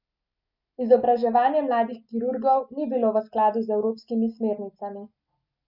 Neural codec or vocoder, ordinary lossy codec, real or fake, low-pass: none; none; real; 5.4 kHz